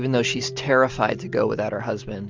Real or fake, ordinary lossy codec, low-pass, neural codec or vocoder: real; Opus, 32 kbps; 7.2 kHz; none